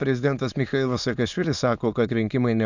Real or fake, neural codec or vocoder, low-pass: fake; autoencoder, 22.05 kHz, a latent of 192 numbers a frame, VITS, trained on many speakers; 7.2 kHz